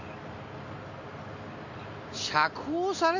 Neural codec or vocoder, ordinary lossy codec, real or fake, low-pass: none; none; real; 7.2 kHz